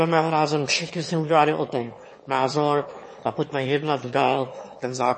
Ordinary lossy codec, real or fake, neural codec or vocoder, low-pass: MP3, 32 kbps; fake; autoencoder, 22.05 kHz, a latent of 192 numbers a frame, VITS, trained on one speaker; 9.9 kHz